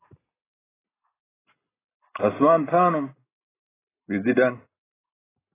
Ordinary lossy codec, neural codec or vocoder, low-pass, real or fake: AAC, 16 kbps; none; 3.6 kHz; real